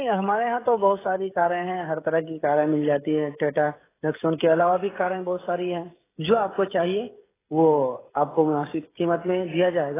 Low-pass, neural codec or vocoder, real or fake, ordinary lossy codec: 3.6 kHz; codec, 16 kHz, 16 kbps, FreqCodec, smaller model; fake; AAC, 16 kbps